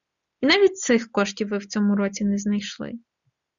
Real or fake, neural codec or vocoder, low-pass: real; none; 7.2 kHz